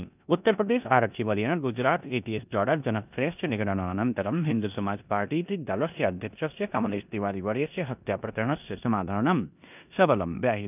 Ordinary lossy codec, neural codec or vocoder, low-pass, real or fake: none; codec, 16 kHz in and 24 kHz out, 0.9 kbps, LongCat-Audio-Codec, four codebook decoder; 3.6 kHz; fake